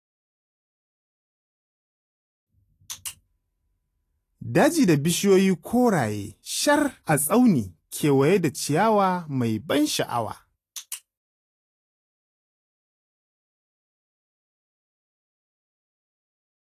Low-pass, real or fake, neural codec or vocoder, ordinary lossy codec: 14.4 kHz; real; none; AAC, 48 kbps